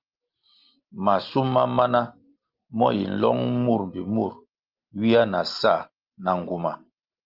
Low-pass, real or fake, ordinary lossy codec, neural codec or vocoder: 5.4 kHz; real; Opus, 32 kbps; none